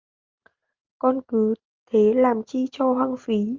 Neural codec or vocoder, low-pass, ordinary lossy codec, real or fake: none; 7.2 kHz; Opus, 32 kbps; real